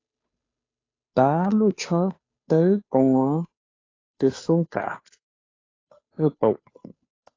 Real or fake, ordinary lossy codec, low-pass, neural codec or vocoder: fake; AAC, 32 kbps; 7.2 kHz; codec, 16 kHz, 2 kbps, FunCodec, trained on Chinese and English, 25 frames a second